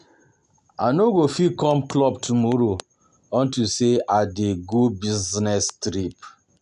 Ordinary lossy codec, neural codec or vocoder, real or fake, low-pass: none; none; real; 10.8 kHz